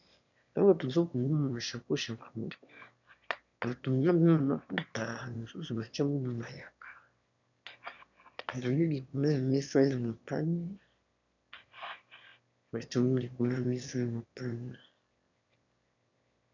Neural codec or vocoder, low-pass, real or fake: autoencoder, 22.05 kHz, a latent of 192 numbers a frame, VITS, trained on one speaker; 7.2 kHz; fake